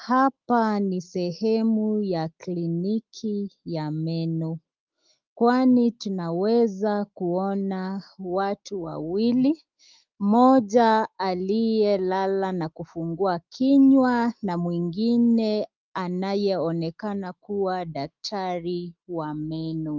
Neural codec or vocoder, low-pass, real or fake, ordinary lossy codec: autoencoder, 48 kHz, 128 numbers a frame, DAC-VAE, trained on Japanese speech; 7.2 kHz; fake; Opus, 32 kbps